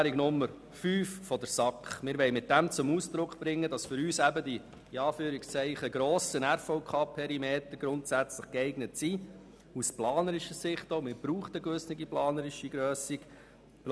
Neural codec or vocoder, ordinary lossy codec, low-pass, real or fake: none; none; none; real